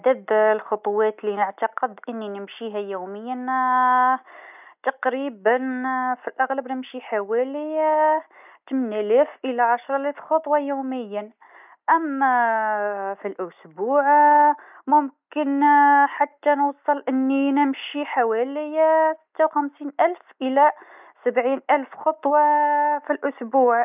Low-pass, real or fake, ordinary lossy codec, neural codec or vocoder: 3.6 kHz; real; none; none